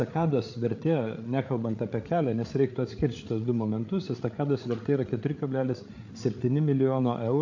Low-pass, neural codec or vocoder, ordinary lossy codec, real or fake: 7.2 kHz; codec, 16 kHz, 8 kbps, FreqCodec, larger model; AAC, 48 kbps; fake